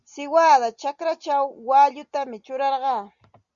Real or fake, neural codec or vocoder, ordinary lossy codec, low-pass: real; none; Opus, 64 kbps; 7.2 kHz